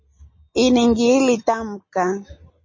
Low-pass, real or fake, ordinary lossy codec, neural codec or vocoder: 7.2 kHz; real; MP3, 32 kbps; none